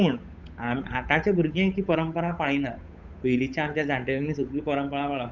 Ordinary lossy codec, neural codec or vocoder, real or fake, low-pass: none; codec, 16 kHz, 8 kbps, FunCodec, trained on Chinese and English, 25 frames a second; fake; 7.2 kHz